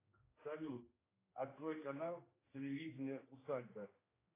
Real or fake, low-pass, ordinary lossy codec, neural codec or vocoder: fake; 3.6 kHz; AAC, 16 kbps; codec, 16 kHz, 2 kbps, X-Codec, HuBERT features, trained on general audio